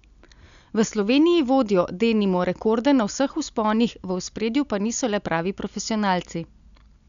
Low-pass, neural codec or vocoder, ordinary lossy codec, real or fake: 7.2 kHz; none; none; real